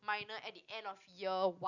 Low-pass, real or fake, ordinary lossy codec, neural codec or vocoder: 7.2 kHz; real; none; none